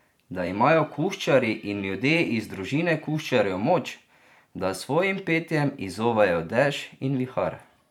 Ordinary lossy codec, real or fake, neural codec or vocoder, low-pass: none; fake; vocoder, 44.1 kHz, 128 mel bands every 512 samples, BigVGAN v2; 19.8 kHz